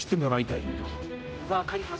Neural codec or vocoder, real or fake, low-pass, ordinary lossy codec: codec, 16 kHz, 0.5 kbps, X-Codec, HuBERT features, trained on general audio; fake; none; none